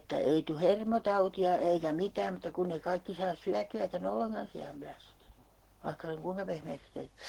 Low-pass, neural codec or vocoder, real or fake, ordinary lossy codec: 19.8 kHz; codec, 44.1 kHz, 7.8 kbps, Pupu-Codec; fake; Opus, 16 kbps